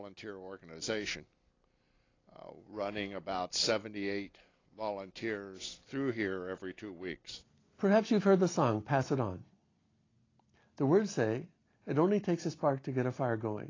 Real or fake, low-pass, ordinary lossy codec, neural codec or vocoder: real; 7.2 kHz; AAC, 32 kbps; none